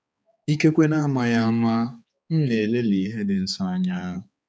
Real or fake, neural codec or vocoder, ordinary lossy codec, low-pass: fake; codec, 16 kHz, 4 kbps, X-Codec, HuBERT features, trained on balanced general audio; none; none